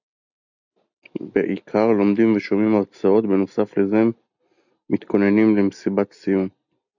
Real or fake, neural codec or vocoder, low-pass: real; none; 7.2 kHz